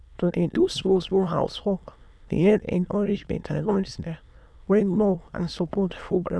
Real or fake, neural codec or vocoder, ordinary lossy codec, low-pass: fake; autoencoder, 22.05 kHz, a latent of 192 numbers a frame, VITS, trained on many speakers; none; none